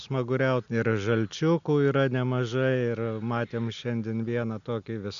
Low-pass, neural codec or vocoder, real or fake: 7.2 kHz; none; real